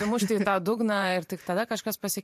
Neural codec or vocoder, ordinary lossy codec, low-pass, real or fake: vocoder, 44.1 kHz, 128 mel bands every 512 samples, BigVGAN v2; MP3, 64 kbps; 14.4 kHz; fake